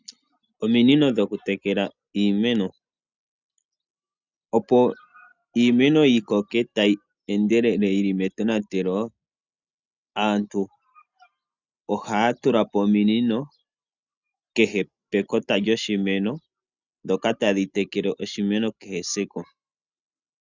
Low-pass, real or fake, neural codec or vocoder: 7.2 kHz; real; none